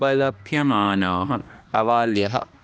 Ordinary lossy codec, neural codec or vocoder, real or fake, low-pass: none; codec, 16 kHz, 1 kbps, X-Codec, HuBERT features, trained on balanced general audio; fake; none